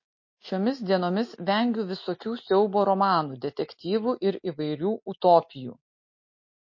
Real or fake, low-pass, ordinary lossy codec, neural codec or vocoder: real; 7.2 kHz; MP3, 32 kbps; none